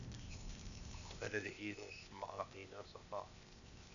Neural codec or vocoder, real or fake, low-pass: codec, 16 kHz, 0.8 kbps, ZipCodec; fake; 7.2 kHz